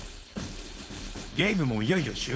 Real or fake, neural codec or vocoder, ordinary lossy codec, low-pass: fake; codec, 16 kHz, 4.8 kbps, FACodec; none; none